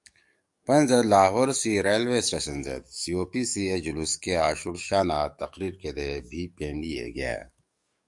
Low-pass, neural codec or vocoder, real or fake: 10.8 kHz; codec, 44.1 kHz, 7.8 kbps, DAC; fake